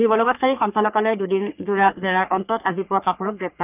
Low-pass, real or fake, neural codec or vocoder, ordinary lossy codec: 3.6 kHz; fake; codec, 44.1 kHz, 3.4 kbps, Pupu-Codec; none